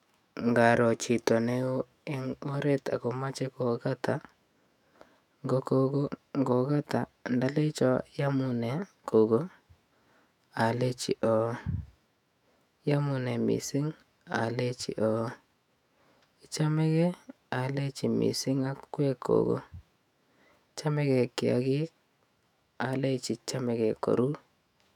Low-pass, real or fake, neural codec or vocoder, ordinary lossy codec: 19.8 kHz; fake; autoencoder, 48 kHz, 128 numbers a frame, DAC-VAE, trained on Japanese speech; none